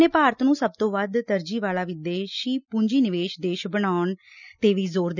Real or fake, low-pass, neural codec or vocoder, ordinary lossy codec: real; 7.2 kHz; none; none